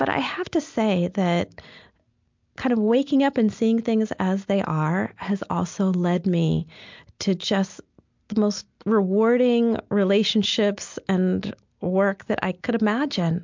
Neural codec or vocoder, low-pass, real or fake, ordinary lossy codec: none; 7.2 kHz; real; MP3, 64 kbps